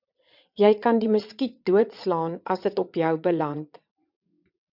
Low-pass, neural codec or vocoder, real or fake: 5.4 kHz; vocoder, 22.05 kHz, 80 mel bands, WaveNeXt; fake